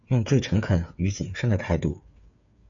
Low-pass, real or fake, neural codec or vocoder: 7.2 kHz; fake; codec, 16 kHz, 8 kbps, FreqCodec, smaller model